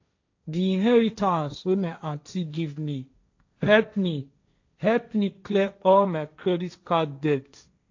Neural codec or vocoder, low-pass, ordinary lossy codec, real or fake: codec, 16 kHz, 1.1 kbps, Voila-Tokenizer; 7.2 kHz; AAC, 48 kbps; fake